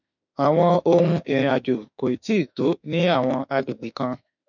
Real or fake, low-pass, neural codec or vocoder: fake; 7.2 kHz; autoencoder, 48 kHz, 32 numbers a frame, DAC-VAE, trained on Japanese speech